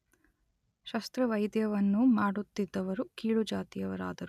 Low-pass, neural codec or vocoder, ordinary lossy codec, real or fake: 14.4 kHz; none; none; real